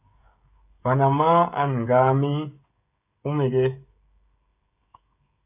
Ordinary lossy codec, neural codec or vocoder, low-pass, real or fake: AAC, 32 kbps; codec, 16 kHz, 16 kbps, FreqCodec, smaller model; 3.6 kHz; fake